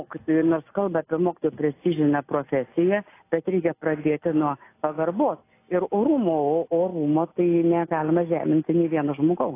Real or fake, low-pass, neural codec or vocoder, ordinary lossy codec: real; 3.6 kHz; none; AAC, 24 kbps